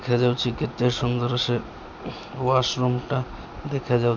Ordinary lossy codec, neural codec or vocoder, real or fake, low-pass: none; none; real; 7.2 kHz